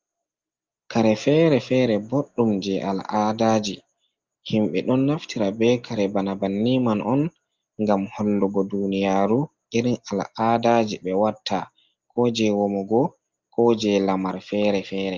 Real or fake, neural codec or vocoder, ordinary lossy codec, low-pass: real; none; Opus, 32 kbps; 7.2 kHz